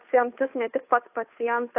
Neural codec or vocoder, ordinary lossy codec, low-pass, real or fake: codec, 16 kHz, 2 kbps, FunCodec, trained on Chinese and English, 25 frames a second; AAC, 32 kbps; 3.6 kHz; fake